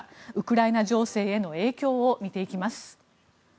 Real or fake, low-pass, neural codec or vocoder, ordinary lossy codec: real; none; none; none